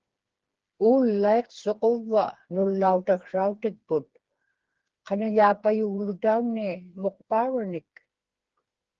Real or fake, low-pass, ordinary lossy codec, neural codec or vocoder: fake; 7.2 kHz; Opus, 16 kbps; codec, 16 kHz, 4 kbps, FreqCodec, smaller model